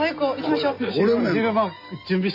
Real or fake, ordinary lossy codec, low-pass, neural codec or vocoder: real; none; 5.4 kHz; none